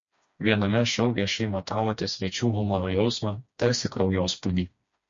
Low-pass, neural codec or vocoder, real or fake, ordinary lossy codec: 7.2 kHz; codec, 16 kHz, 2 kbps, FreqCodec, smaller model; fake; MP3, 48 kbps